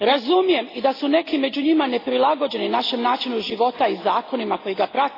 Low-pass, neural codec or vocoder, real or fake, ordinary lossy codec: 5.4 kHz; none; real; AAC, 24 kbps